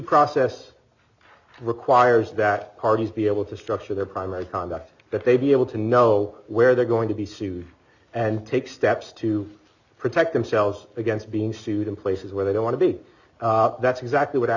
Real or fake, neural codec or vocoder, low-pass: real; none; 7.2 kHz